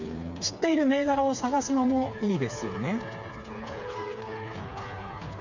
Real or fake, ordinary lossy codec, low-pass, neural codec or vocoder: fake; none; 7.2 kHz; codec, 16 kHz, 4 kbps, FreqCodec, smaller model